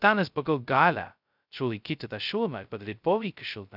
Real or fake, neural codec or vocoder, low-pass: fake; codec, 16 kHz, 0.2 kbps, FocalCodec; 5.4 kHz